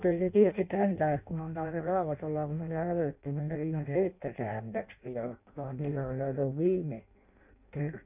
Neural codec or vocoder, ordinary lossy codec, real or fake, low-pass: codec, 16 kHz in and 24 kHz out, 0.6 kbps, FireRedTTS-2 codec; none; fake; 3.6 kHz